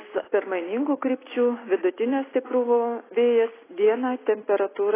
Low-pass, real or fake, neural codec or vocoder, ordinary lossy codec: 3.6 kHz; real; none; AAC, 16 kbps